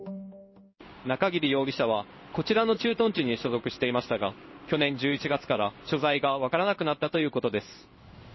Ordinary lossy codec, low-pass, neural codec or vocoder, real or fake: MP3, 24 kbps; 7.2 kHz; codec, 16 kHz in and 24 kHz out, 1 kbps, XY-Tokenizer; fake